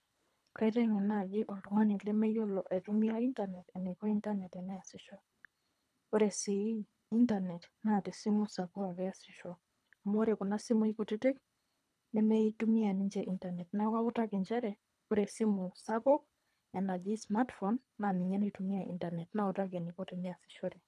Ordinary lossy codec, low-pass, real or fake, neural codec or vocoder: none; none; fake; codec, 24 kHz, 3 kbps, HILCodec